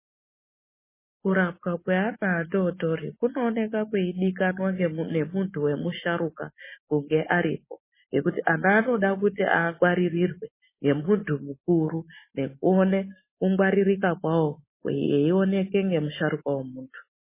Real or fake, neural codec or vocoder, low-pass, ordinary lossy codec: real; none; 3.6 kHz; MP3, 16 kbps